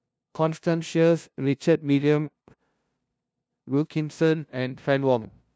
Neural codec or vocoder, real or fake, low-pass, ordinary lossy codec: codec, 16 kHz, 0.5 kbps, FunCodec, trained on LibriTTS, 25 frames a second; fake; none; none